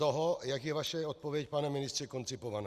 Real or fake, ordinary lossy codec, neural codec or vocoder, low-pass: real; Opus, 64 kbps; none; 14.4 kHz